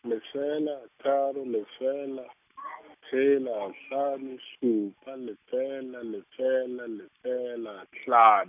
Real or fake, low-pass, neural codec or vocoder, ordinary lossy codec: real; 3.6 kHz; none; none